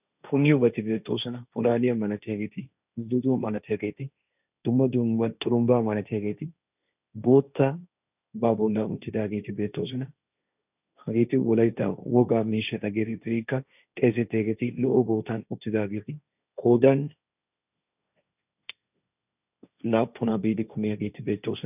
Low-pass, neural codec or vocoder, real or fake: 3.6 kHz; codec, 16 kHz, 1.1 kbps, Voila-Tokenizer; fake